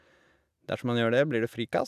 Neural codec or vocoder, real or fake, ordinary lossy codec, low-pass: none; real; none; 14.4 kHz